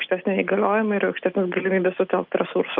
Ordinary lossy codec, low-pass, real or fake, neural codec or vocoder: Opus, 24 kbps; 5.4 kHz; real; none